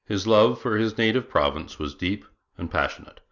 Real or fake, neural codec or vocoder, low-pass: real; none; 7.2 kHz